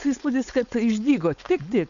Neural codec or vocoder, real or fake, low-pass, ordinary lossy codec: codec, 16 kHz, 4.8 kbps, FACodec; fake; 7.2 kHz; MP3, 96 kbps